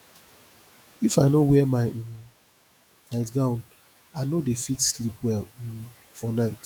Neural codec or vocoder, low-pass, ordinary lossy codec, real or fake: autoencoder, 48 kHz, 128 numbers a frame, DAC-VAE, trained on Japanese speech; none; none; fake